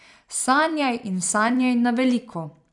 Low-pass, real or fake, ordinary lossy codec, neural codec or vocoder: 10.8 kHz; fake; none; vocoder, 44.1 kHz, 128 mel bands every 256 samples, BigVGAN v2